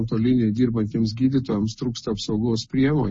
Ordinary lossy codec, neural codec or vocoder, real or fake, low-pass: MP3, 32 kbps; none; real; 9.9 kHz